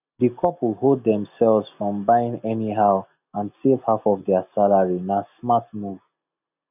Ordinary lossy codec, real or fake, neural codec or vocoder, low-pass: none; real; none; 3.6 kHz